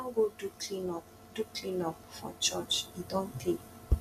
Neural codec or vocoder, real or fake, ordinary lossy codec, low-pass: none; real; none; 14.4 kHz